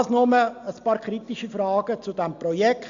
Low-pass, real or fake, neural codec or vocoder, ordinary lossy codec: 7.2 kHz; real; none; Opus, 64 kbps